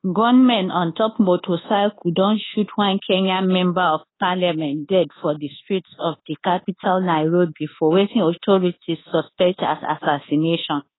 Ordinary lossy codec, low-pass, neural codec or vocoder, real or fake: AAC, 16 kbps; 7.2 kHz; codec, 24 kHz, 1.2 kbps, DualCodec; fake